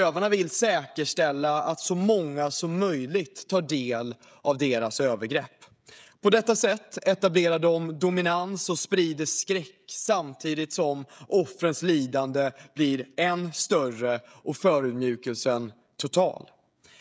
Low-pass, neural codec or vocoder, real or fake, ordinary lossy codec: none; codec, 16 kHz, 16 kbps, FreqCodec, smaller model; fake; none